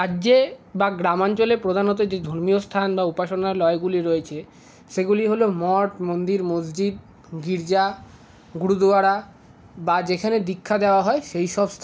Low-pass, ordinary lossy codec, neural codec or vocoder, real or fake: none; none; none; real